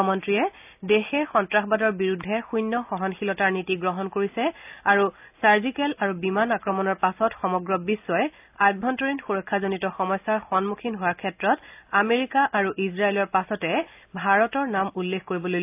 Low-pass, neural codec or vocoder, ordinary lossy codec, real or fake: 3.6 kHz; none; AAC, 32 kbps; real